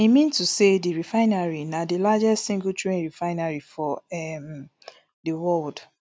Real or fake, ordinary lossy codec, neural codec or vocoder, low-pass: real; none; none; none